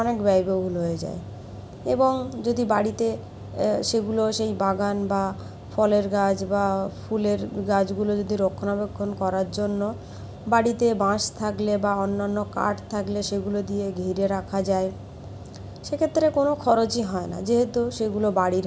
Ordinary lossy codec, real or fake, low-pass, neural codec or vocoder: none; real; none; none